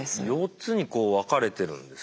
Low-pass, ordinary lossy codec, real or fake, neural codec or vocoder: none; none; real; none